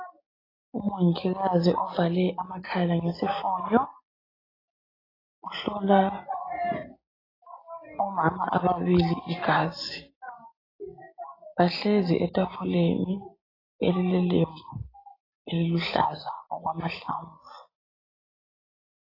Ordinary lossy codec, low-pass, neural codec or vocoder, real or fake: AAC, 24 kbps; 5.4 kHz; none; real